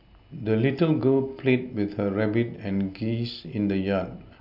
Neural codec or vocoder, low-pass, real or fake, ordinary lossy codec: none; 5.4 kHz; real; none